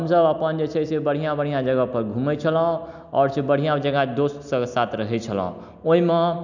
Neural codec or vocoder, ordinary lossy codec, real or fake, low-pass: none; none; real; 7.2 kHz